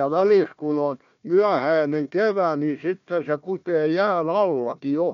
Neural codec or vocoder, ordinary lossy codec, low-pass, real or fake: codec, 16 kHz, 1 kbps, FunCodec, trained on Chinese and English, 50 frames a second; MP3, 64 kbps; 7.2 kHz; fake